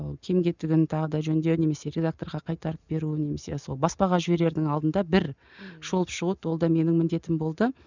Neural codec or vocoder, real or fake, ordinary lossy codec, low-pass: none; real; none; 7.2 kHz